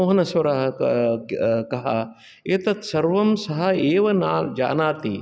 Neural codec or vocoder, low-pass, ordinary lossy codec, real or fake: none; none; none; real